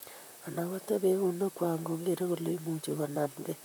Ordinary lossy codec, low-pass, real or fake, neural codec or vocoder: none; none; fake; vocoder, 44.1 kHz, 128 mel bands, Pupu-Vocoder